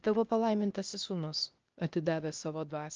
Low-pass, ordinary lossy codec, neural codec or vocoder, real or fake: 7.2 kHz; Opus, 24 kbps; codec, 16 kHz, 0.8 kbps, ZipCodec; fake